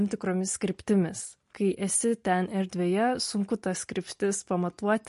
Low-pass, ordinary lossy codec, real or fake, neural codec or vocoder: 14.4 kHz; MP3, 48 kbps; real; none